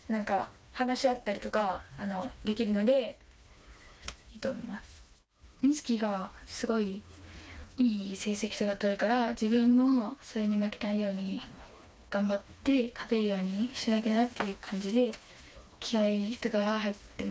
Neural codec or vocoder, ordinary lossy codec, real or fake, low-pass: codec, 16 kHz, 2 kbps, FreqCodec, smaller model; none; fake; none